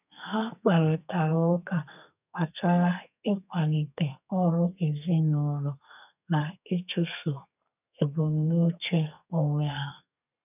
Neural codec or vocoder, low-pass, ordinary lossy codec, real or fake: codec, 32 kHz, 1.9 kbps, SNAC; 3.6 kHz; none; fake